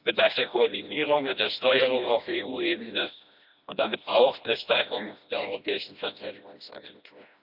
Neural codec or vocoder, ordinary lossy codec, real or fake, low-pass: codec, 16 kHz, 1 kbps, FreqCodec, smaller model; none; fake; 5.4 kHz